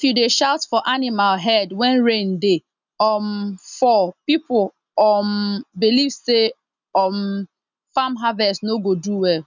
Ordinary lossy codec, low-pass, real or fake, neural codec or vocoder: none; 7.2 kHz; real; none